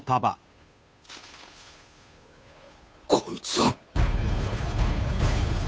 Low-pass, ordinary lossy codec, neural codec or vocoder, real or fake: none; none; codec, 16 kHz, 2 kbps, FunCodec, trained on Chinese and English, 25 frames a second; fake